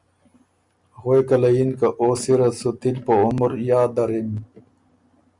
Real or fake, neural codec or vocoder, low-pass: real; none; 10.8 kHz